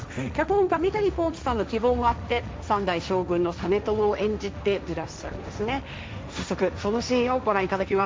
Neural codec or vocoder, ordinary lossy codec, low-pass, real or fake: codec, 16 kHz, 1.1 kbps, Voila-Tokenizer; none; none; fake